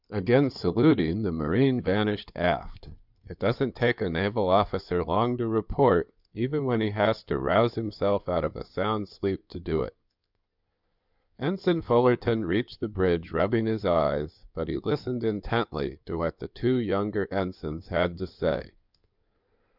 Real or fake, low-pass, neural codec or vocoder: fake; 5.4 kHz; codec, 16 kHz in and 24 kHz out, 2.2 kbps, FireRedTTS-2 codec